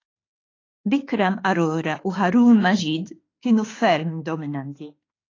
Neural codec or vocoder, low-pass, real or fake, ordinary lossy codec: autoencoder, 48 kHz, 32 numbers a frame, DAC-VAE, trained on Japanese speech; 7.2 kHz; fake; AAC, 32 kbps